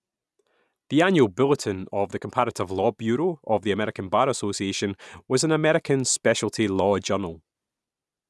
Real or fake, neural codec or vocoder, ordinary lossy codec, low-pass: real; none; none; none